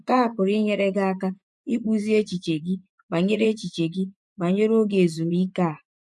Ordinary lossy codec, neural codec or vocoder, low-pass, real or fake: none; vocoder, 24 kHz, 100 mel bands, Vocos; none; fake